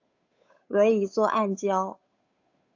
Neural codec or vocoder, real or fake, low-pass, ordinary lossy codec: codec, 16 kHz, 8 kbps, FunCodec, trained on Chinese and English, 25 frames a second; fake; 7.2 kHz; AAC, 48 kbps